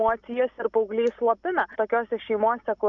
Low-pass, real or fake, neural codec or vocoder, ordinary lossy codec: 7.2 kHz; real; none; MP3, 96 kbps